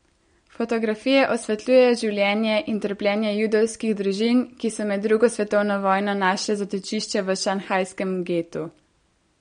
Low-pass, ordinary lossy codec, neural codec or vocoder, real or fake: 9.9 kHz; MP3, 48 kbps; none; real